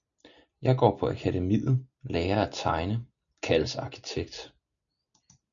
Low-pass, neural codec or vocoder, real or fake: 7.2 kHz; none; real